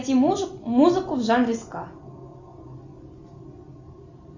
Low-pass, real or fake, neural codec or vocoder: 7.2 kHz; real; none